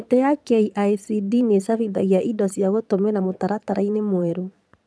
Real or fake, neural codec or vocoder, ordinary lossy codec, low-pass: fake; vocoder, 22.05 kHz, 80 mel bands, Vocos; none; none